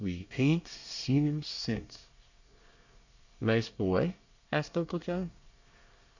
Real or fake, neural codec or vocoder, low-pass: fake; codec, 24 kHz, 1 kbps, SNAC; 7.2 kHz